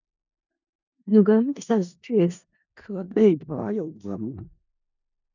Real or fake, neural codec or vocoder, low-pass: fake; codec, 16 kHz in and 24 kHz out, 0.4 kbps, LongCat-Audio-Codec, four codebook decoder; 7.2 kHz